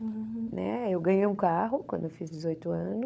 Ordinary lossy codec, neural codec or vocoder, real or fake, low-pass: none; codec, 16 kHz, 16 kbps, FunCodec, trained on LibriTTS, 50 frames a second; fake; none